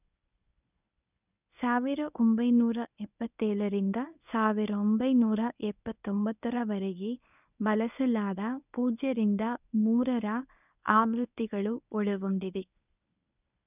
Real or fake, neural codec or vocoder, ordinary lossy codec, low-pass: fake; codec, 24 kHz, 0.9 kbps, WavTokenizer, medium speech release version 1; none; 3.6 kHz